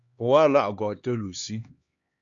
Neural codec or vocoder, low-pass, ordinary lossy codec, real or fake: codec, 16 kHz, 2 kbps, X-Codec, HuBERT features, trained on LibriSpeech; 7.2 kHz; Opus, 64 kbps; fake